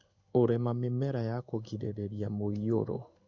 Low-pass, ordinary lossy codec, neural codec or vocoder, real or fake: 7.2 kHz; none; codec, 16 kHz in and 24 kHz out, 1 kbps, XY-Tokenizer; fake